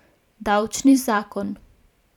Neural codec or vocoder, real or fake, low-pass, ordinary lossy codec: vocoder, 44.1 kHz, 128 mel bands every 512 samples, BigVGAN v2; fake; 19.8 kHz; none